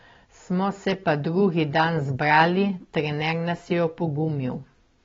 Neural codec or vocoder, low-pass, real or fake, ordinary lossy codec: none; 7.2 kHz; real; AAC, 24 kbps